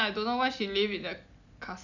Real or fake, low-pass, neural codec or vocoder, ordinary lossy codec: real; 7.2 kHz; none; none